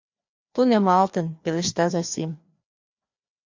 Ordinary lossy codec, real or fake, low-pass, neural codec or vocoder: MP3, 48 kbps; fake; 7.2 kHz; codec, 16 kHz, 2 kbps, FreqCodec, larger model